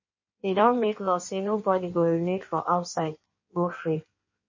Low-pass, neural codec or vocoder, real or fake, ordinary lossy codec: 7.2 kHz; codec, 16 kHz in and 24 kHz out, 1.1 kbps, FireRedTTS-2 codec; fake; MP3, 32 kbps